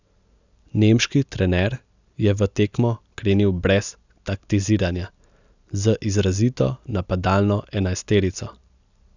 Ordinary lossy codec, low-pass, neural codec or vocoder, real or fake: none; 7.2 kHz; none; real